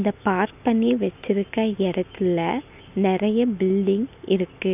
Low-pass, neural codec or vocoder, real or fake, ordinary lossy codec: 3.6 kHz; none; real; none